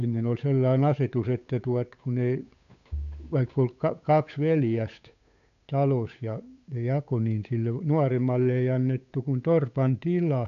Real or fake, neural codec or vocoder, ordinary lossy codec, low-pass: fake; codec, 16 kHz, 8 kbps, FunCodec, trained on Chinese and English, 25 frames a second; none; 7.2 kHz